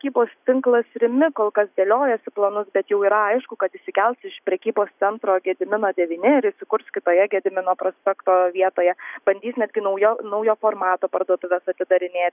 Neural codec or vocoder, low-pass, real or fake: none; 3.6 kHz; real